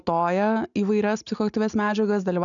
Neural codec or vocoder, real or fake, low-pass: none; real; 7.2 kHz